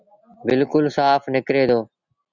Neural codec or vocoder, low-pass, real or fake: none; 7.2 kHz; real